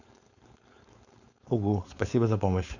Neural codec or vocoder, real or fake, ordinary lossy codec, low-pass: codec, 16 kHz, 4.8 kbps, FACodec; fake; none; 7.2 kHz